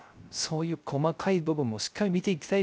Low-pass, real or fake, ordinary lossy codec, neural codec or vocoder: none; fake; none; codec, 16 kHz, 0.3 kbps, FocalCodec